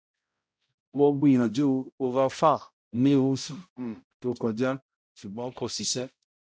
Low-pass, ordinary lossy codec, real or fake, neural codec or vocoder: none; none; fake; codec, 16 kHz, 0.5 kbps, X-Codec, HuBERT features, trained on balanced general audio